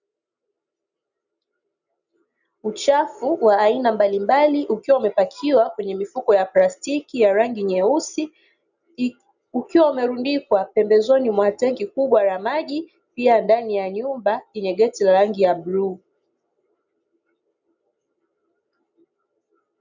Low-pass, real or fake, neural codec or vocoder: 7.2 kHz; real; none